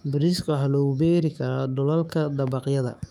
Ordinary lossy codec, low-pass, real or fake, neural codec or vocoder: none; 19.8 kHz; fake; autoencoder, 48 kHz, 128 numbers a frame, DAC-VAE, trained on Japanese speech